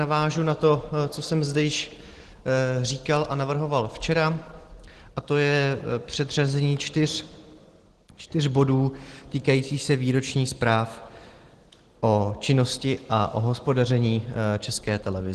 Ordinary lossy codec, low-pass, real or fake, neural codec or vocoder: Opus, 16 kbps; 10.8 kHz; real; none